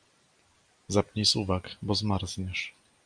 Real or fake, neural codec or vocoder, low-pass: real; none; 9.9 kHz